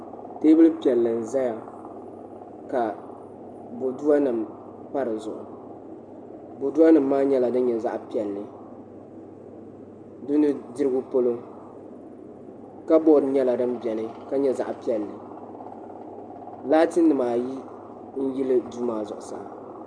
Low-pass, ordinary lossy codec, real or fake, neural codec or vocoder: 9.9 kHz; Opus, 64 kbps; real; none